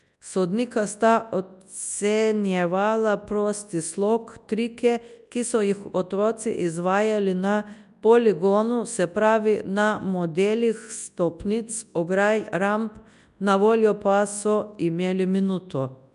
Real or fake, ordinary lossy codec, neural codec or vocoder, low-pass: fake; none; codec, 24 kHz, 0.9 kbps, WavTokenizer, large speech release; 10.8 kHz